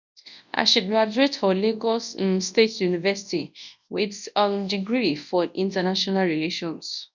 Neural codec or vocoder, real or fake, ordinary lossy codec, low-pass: codec, 24 kHz, 0.9 kbps, WavTokenizer, large speech release; fake; none; 7.2 kHz